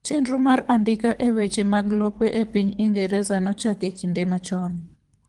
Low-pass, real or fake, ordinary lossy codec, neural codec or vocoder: 10.8 kHz; fake; Opus, 24 kbps; codec, 24 kHz, 3 kbps, HILCodec